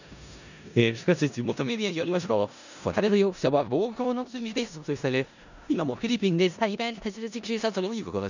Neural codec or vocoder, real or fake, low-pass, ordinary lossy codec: codec, 16 kHz in and 24 kHz out, 0.4 kbps, LongCat-Audio-Codec, four codebook decoder; fake; 7.2 kHz; none